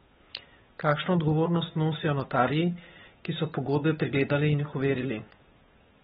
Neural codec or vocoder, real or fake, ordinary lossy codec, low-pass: codec, 16 kHz, 8 kbps, FunCodec, trained on Chinese and English, 25 frames a second; fake; AAC, 16 kbps; 7.2 kHz